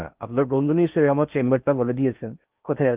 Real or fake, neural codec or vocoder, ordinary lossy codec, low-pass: fake; codec, 16 kHz in and 24 kHz out, 0.6 kbps, FocalCodec, streaming, 4096 codes; Opus, 32 kbps; 3.6 kHz